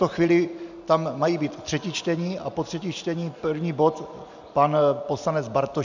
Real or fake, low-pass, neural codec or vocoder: real; 7.2 kHz; none